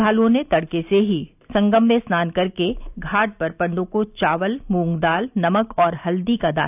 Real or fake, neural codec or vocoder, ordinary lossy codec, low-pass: real; none; none; 3.6 kHz